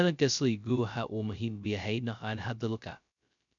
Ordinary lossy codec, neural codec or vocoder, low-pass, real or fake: none; codec, 16 kHz, 0.2 kbps, FocalCodec; 7.2 kHz; fake